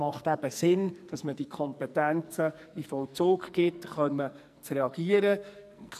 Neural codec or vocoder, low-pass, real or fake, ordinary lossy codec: codec, 44.1 kHz, 2.6 kbps, SNAC; 14.4 kHz; fake; none